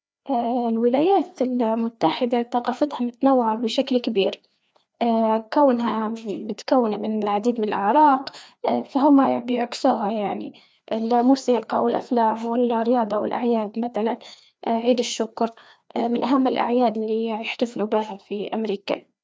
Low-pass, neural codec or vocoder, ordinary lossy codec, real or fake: none; codec, 16 kHz, 2 kbps, FreqCodec, larger model; none; fake